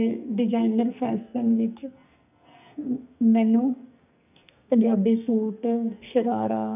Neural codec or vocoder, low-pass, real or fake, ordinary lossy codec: codec, 44.1 kHz, 2.6 kbps, SNAC; 3.6 kHz; fake; none